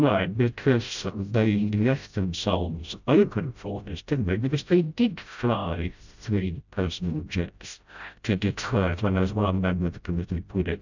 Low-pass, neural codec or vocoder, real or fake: 7.2 kHz; codec, 16 kHz, 0.5 kbps, FreqCodec, smaller model; fake